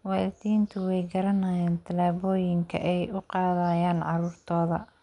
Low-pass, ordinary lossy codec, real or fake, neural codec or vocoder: 10.8 kHz; none; real; none